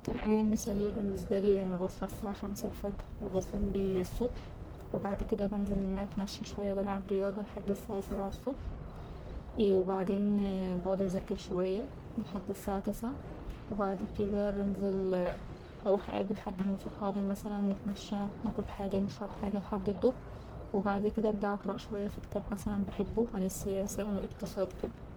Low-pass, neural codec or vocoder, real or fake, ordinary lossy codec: none; codec, 44.1 kHz, 1.7 kbps, Pupu-Codec; fake; none